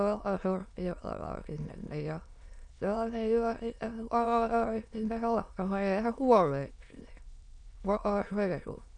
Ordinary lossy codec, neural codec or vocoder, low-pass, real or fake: none; autoencoder, 22.05 kHz, a latent of 192 numbers a frame, VITS, trained on many speakers; 9.9 kHz; fake